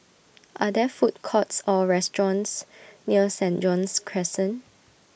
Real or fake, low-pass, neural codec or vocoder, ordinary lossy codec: real; none; none; none